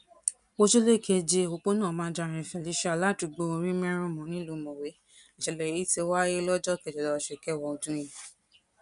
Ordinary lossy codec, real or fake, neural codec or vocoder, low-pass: none; real; none; 10.8 kHz